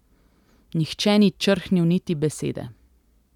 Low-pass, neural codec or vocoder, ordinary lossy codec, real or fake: 19.8 kHz; none; none; real